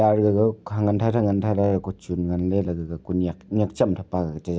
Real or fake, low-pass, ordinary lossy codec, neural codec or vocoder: real; none; none; none